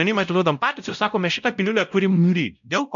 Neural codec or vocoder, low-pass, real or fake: codec, 16 kHz, 0.5 kbps, X-Codec, WavLM features, trained on Multilingual LibriSpeech; 7.2 kHz; fake